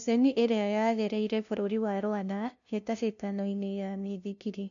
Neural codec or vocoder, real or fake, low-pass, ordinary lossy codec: codec, 16 kHz, 0.5 kbps, FunCodec, trained on LibriTTS, 25 frames a second; fake; 7.2 kHz; none